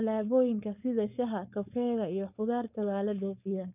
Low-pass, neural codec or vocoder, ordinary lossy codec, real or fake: 3.6 kHz; codec, 16 kHz, 4.8 kbps, FACodec; none; fake